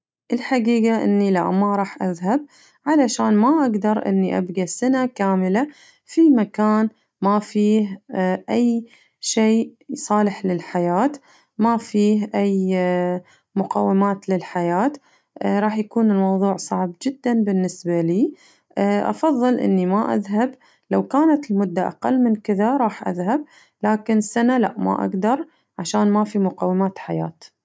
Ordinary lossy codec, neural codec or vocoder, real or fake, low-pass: none; none; real; none